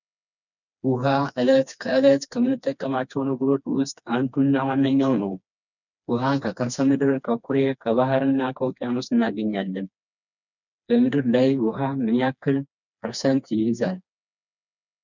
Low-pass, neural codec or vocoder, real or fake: 7.2 kHz; codec, 16 kHz, 2 kbps, FreqCodec, smaller model; fake